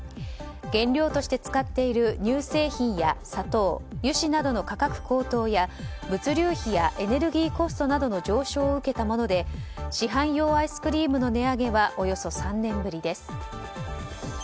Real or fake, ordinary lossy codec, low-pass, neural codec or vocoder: real; none; none; none